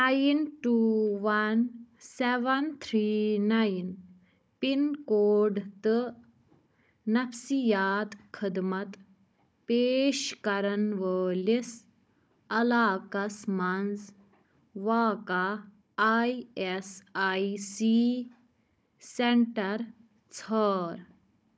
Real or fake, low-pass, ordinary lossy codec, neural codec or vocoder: fake; none; none; codec, 16 kHz, 16 kbps, FunCodec, trained on Chinese and English, 50 frames a second